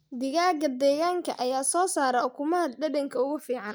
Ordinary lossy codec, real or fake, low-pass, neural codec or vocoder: none; real; none; none